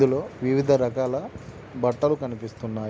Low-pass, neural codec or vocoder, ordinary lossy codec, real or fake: none; none; none; real